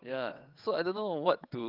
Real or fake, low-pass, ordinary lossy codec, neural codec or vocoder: fake; 5.4 kHz; Opus, 32 kbps; vocoder, 22.05 kHz, 80 mel bands, WaveNeXt